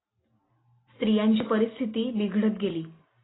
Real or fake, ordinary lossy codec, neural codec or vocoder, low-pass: real; AAC, 16 kbps; none; 7.2 kHz